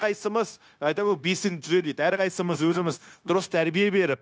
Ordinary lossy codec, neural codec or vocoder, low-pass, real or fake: none; codec, 16 kHz, 0.9 kbps, LongCat-Audio-Codec; none; fake